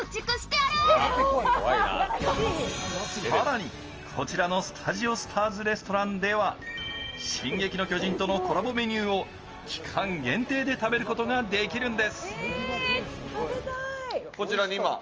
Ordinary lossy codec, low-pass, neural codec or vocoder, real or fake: Opus, 24 kbps; 7.2 kHz; none; real